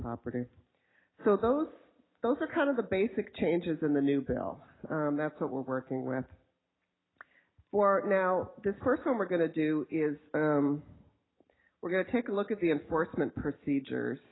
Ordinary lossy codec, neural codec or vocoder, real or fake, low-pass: AAC, 16 kbps; none; real; 7.2 kHz